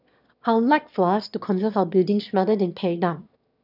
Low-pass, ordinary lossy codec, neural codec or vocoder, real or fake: 5.4 kHz; none; autoencoder, 22.05 kHz, a latent of 192 numbers a frame, VITS, trained on one speaker; fake